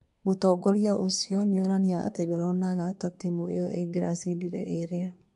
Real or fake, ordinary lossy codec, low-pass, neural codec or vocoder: fake; AAC, 64 kbps; 10.8 kHz; codec, 24 kHz, 1 kbps, SNAC